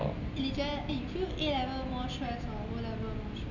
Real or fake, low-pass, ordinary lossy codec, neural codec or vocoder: real; 7.2 kHz; none; none